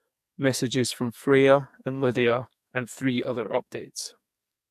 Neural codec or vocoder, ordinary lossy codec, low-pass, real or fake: codec, 44.1 kHz, 2.6 kbps, SNAC; MP3, 96 kbps; 14.4 kHz; fake